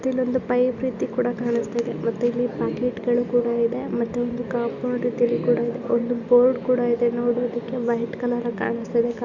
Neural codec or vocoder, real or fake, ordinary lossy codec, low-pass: none; real; Opus, 64 kbps; 7.2 kHz